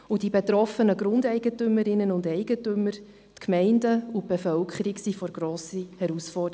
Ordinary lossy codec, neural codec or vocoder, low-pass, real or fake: none; none; none; real